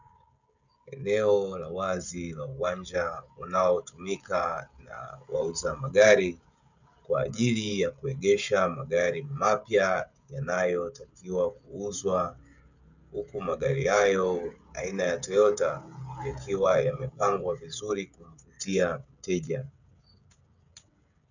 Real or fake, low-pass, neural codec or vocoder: fake; 7.2 kHz; codec, 16 kHz, 16 kbps, FreqCodec, smaller model